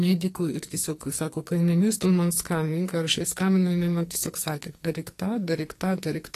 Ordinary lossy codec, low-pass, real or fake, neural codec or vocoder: AAC, 48 kbps; 14.4 kHz; fake; codec, 44.1 kHz, 2.6 kbps, SNAC